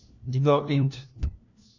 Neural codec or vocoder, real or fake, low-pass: codec, 16 kHz, 1 kbps, FunCodec, trained on LibriTTS, 50 frames a second; fake; 7.2 kHz